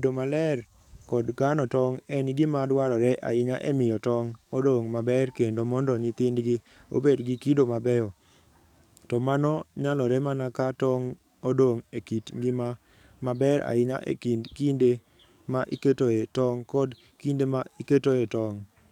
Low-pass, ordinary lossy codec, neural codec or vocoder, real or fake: 19.8 kHz; none; codec, 44.1 kHz, 7.8 kbps, DAC; fake